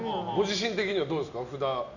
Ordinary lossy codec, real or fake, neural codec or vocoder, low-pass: none; real; none; 7.2 kHz